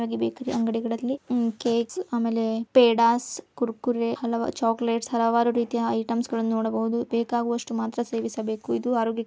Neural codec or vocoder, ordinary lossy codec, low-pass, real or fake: none; none; none; real